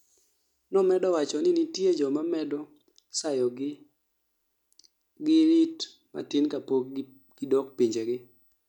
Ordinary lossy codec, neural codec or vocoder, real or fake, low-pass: none; none; real; 19.8 kHz